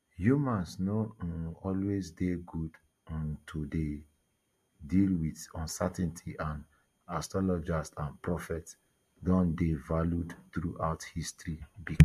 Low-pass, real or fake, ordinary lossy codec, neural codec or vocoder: 14.4 kHz; real; MP3, 64 kbps; none